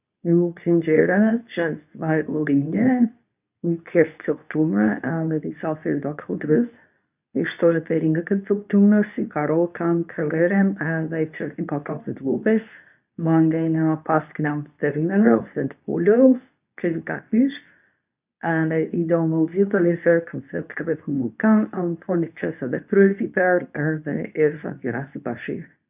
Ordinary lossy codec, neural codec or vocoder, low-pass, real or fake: none; codec, 24 kHz, 0.9 kbps, WavTokenizer, medium speech release version 2; 3.6 kHz; fake